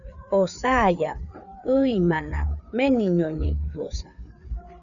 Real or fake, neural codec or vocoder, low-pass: fake; codec, 16 kHz, 4 kbps, FreqCodec, larger model; 7.2 kHz